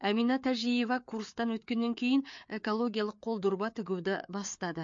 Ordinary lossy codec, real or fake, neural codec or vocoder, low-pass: MP3, 48 kbps; fake; codec, 16 kHz, 4 kbps, FreqCodec, larger model; 7.2 kHz